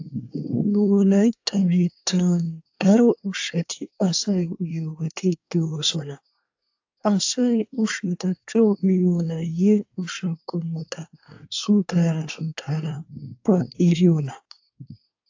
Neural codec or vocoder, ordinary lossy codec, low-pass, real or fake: codec, 24 kHz, 1 kbps, SNAC; AAC, 48 kbps; 7.2 kHz; fake